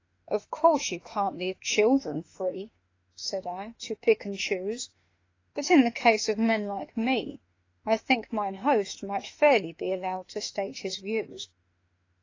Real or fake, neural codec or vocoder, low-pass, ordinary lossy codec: fake; autoencoder, 48 kHz, 32 numbers a frame, DAC-VAE, trained on Japanese speech; 7.2 kHz; AAC, 32 kbps